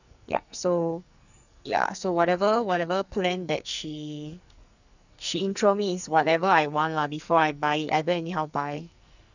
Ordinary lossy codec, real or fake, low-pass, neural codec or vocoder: none; fake; 7.2 kHz; codec, 44.1 kHz, 2.6 kbps, SNAC